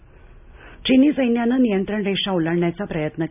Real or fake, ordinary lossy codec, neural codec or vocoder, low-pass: real; none; none; 3.6 kHz